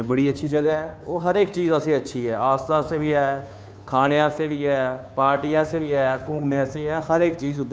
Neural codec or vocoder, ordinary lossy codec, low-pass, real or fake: codec, 16 kHz, 2 kbps, FunCodec, trained on Chinese and English, 25 frames a second; none; none; fake